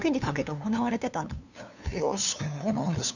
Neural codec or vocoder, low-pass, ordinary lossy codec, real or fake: codec, 16 kHz, 2 kbps, FunCodec, trained on LibriTTS, 25 frames a second; 7.2 kHz; none; fake